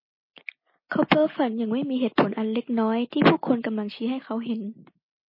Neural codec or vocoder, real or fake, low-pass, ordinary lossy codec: none; real; 5.4 kHz; MP3, 24 kbps